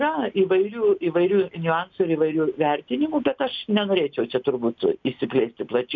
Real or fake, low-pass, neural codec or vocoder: real; 7.2 kHz; none